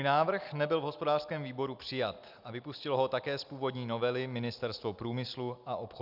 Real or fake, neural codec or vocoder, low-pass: real; none; 5.4 kHz